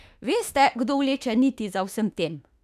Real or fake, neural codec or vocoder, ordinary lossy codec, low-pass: fake; autoencoder, 48 kHz, 32 numbers a frame, DAC-VAE, trained on Japanese speech; none; 14.4 kHz